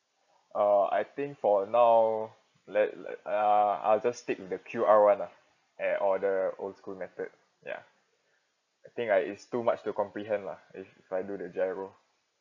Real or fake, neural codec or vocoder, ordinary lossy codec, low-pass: real; none; none; 7.2 kHz